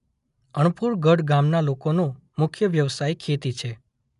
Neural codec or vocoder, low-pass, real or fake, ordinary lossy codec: none; 10.8 kHz; real; none